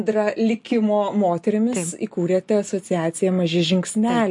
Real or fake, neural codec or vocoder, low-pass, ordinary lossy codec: real; none; 10.8 kHz; MP3, 48 kbps